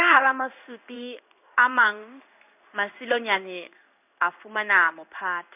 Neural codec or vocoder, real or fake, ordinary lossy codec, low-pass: codec, 16 kHz in and 24 kHz out, 1 kbps, XY-Tokenizer; fake; none; 3.6 kHz